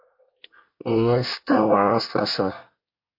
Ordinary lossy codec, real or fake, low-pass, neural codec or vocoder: MP3, 32 kbps; fake; 5.4 kHz; codec, 24 kHz, 1 kbps, SNAC